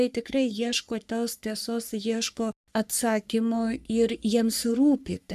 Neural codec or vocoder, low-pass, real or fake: codec, 44.1 kHz, 3.4 kbps, Pupu-Codec; 14.4 kHz; fake